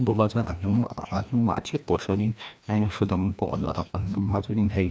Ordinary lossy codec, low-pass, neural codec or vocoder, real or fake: none; none; codec, 16 kHz, 1 kbps, FreqCodec, larger model; fake